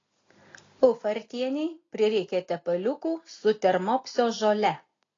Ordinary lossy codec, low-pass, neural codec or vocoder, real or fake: AAC, 32 kbps; 7.2 kHz; none; real